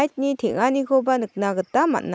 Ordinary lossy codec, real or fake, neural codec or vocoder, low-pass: none; real; none; none